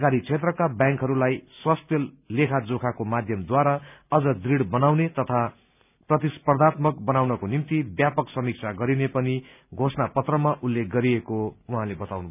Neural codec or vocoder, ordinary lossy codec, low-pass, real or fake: none; none; 3.6 kHz; real